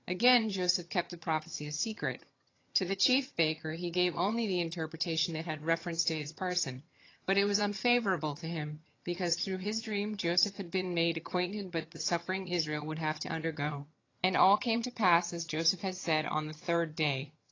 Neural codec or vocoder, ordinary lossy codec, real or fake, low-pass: vocoder, 22.05 kHz, 80 mel bands, HiFi-GAN; AAC, 32 kbps; fake; 7.2 kHz